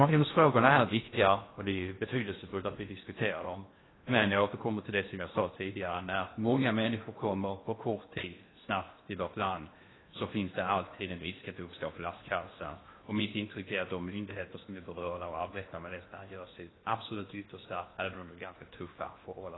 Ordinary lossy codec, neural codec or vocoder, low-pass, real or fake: AAC, 16 kbps; codec, 16 kHz in and 24 kHz out, 0.8 kbps, FocalCodec, streaming, 65536 codes; 7.2 kHz; fake